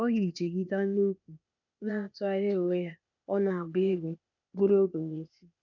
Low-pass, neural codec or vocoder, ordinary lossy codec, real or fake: 7.2 kHz; codec, 16 kHz, 0.8 kbps, ZipCodec; none; fake